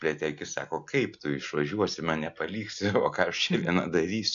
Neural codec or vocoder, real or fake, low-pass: none; real; 7.2 kHz